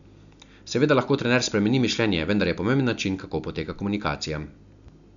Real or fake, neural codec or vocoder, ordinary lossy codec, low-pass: real; none; none; 7.2 kHz